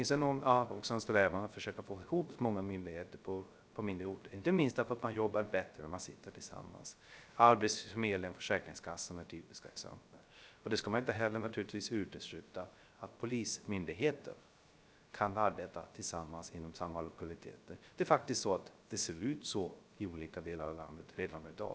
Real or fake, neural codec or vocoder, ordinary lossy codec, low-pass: fake; codec, 16 kHz, 0.3 kbps, FocalCodec; none; none